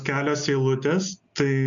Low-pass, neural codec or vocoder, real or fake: 7.2 kHz; none; real